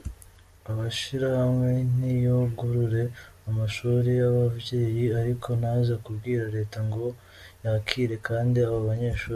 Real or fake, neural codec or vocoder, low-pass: real; none; 14.4 kHz